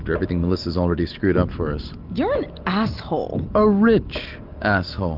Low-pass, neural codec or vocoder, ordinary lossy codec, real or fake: 5.4 kHz; none; Opus, 24 kbps; real